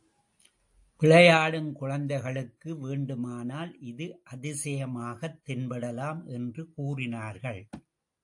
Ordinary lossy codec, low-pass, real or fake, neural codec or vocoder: AAC, 64 kbps; 10.8 kHz; real; none